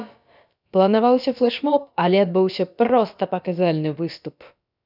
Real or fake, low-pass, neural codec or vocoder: fake; 5.4 kHz; codec, 16 kHz, about 1 kbps, DyCAST, with the encoder's durations